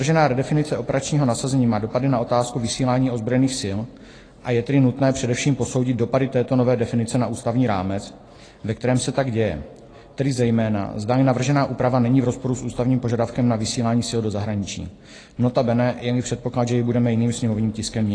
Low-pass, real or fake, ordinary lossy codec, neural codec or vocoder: 9.9 kHz; real; AAC, 32 kbps; none